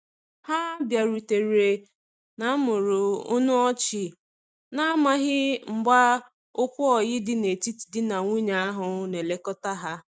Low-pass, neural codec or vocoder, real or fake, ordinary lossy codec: none; none; real; none